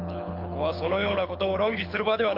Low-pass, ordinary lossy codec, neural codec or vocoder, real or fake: 5.4 kHz; MP3, 48 kbps; codec, 24 kHz, 6 kbps, HILCodec; fake